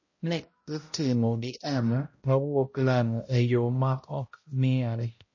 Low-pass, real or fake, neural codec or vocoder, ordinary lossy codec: 7.2 kHz; fake; codec, 16 kHz, 0.5 kbps, X-Codec, HuBERT features, trained on balanced general audio; MP3, 32 kbps